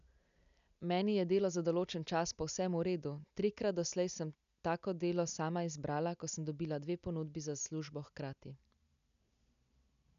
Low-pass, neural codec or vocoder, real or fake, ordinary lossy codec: 7.2 kHz; none; real; none